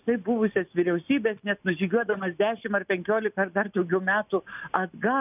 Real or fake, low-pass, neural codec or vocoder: real; 3.6 kHz; none